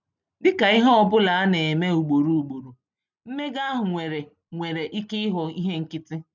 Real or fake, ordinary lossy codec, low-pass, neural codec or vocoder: real; none; 7.2 kHz; none